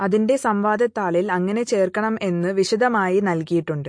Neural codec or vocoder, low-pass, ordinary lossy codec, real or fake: none; 9.9 kHz; MP3, 48 kbps; real